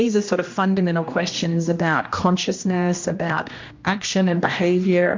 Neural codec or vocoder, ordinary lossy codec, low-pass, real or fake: codec, 16 kHz, 1 kbps, X-Codec, HuBERT features, trained on general audio; MP3, 48 kbps; 7.2 kHz; fake